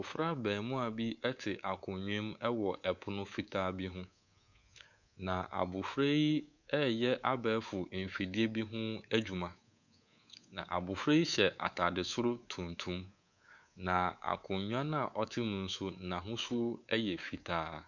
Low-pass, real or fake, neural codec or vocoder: 7.2 kHz; fake; codec, 24 kHz, 3.1 kbps, DualCodec